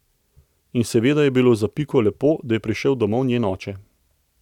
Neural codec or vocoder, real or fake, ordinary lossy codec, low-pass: none; real; none; 19.8 kHz